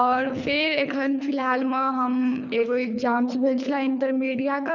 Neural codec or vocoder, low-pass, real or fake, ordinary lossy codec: codec, 24 kHz, 3 kbps, HILCodec; 7.2 kHz; fake; none